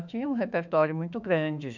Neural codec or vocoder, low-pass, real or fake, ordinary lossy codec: autoencoder, 48 kHz, 32 numbers a frame, DAC-VAE, trained on Japanese speech; 7.2 kHz; fake; none